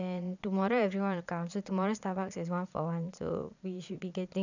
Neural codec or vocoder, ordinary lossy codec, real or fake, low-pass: vocoder, 22.05 kHz, 80 mel bands, Vocos; none; fake; 7.2 kHz